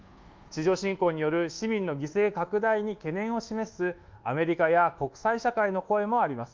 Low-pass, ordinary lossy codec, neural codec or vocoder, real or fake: 7.2 kHz; Opus, 32 kbps; codec, 24 kHz, 1.2 kbps, DualCodec; fake